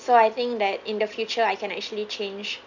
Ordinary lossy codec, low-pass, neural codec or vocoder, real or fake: none; 7.2 kHz; none; real